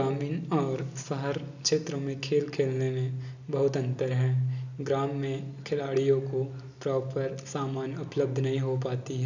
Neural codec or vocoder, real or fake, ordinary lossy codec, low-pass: none; real; none; 7.2 kHz